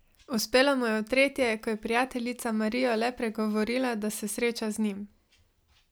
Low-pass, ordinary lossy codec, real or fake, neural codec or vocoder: none; none; real; none